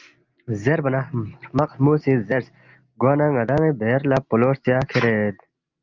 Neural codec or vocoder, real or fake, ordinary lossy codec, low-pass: none; real; Opus, 24 kbps; 7.2 kHz